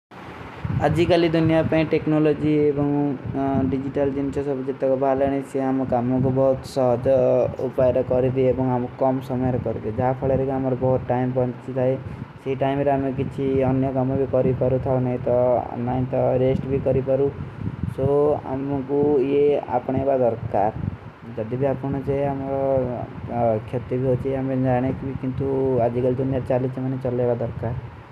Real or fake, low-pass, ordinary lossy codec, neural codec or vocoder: real; 14.4 kHz; none; none